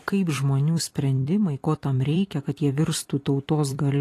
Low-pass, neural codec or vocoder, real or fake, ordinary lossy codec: 14.4 kHz; vocoder, 44.1 kHz, 128 mel bands every 256 samples, BigVGAN v2; fake; AAC, 48 kbps